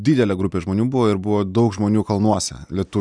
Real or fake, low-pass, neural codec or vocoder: real; 9.9 kHz; none